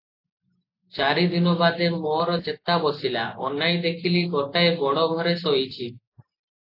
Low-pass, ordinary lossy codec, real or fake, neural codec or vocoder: 5.4 kHz; AAC, 32 kbps; real; none